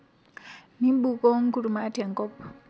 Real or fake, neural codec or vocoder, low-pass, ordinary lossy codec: real; none; none; none